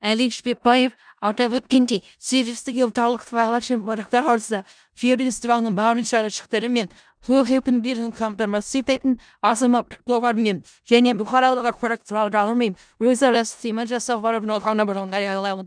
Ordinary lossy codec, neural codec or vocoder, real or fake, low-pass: none; codec, 16 kHz in and 24 kHz out, 0.4 kbps, LongCat-Audio-Codec, four codebook decoder; fake; 9.9 kHz